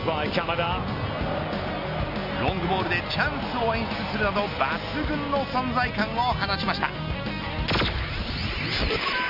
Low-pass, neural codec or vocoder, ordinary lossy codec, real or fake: 5.4 kHz; none; none; real